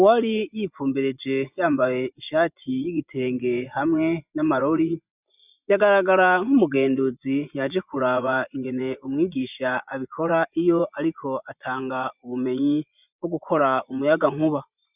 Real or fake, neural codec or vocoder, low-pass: fake; vocoder, 24 kHz, 100 mel bands, Vocos; 3.6 kHz